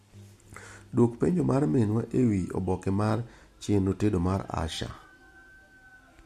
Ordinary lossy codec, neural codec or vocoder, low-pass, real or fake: MP3, 64 kbps; none; 14.4 kHz; real